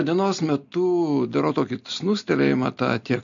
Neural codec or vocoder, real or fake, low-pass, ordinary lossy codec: none; real; 7.2 kHz; MP3, 64 kbps